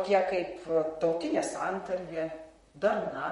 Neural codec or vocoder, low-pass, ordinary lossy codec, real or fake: vocoder, 44.1 kHz, 128 mel bands, Pupu-Vocoder; 19.8 kHz; MP3, 48 kbps; fake